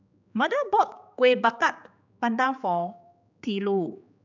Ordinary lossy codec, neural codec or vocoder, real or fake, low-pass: none; codec, 16 kHz, 4 kbps, X-Codec, HuBERT features, trained on general audio; fake; 7.2 kHz